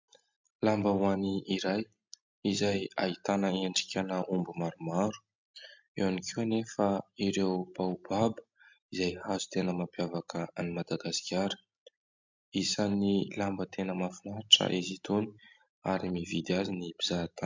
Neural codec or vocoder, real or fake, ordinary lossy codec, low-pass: none; real; MP3, 64 kbps; 7.2 kHz